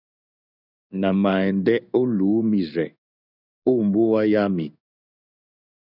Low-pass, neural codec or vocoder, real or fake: 5.4 kHz; codec, 16 kHz in and 24 kHz out, 1 kbps, XY-Tokenizer; fake